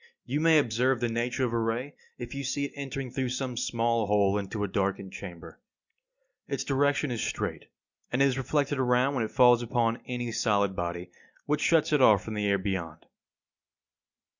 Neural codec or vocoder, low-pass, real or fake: none; 7.2 kHz; real